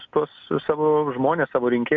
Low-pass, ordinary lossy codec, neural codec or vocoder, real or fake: 7.2 kHz; AAC, 64 kbps; none; real